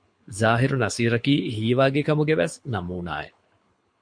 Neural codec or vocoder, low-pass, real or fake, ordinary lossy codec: codec, 24 kHz, 6 kbps, HILCodec; 9.9 kHz; fake; MP3, 48 kbps